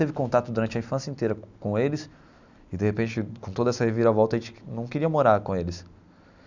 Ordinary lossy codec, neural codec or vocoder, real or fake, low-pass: none; none; real; 7.2 kHz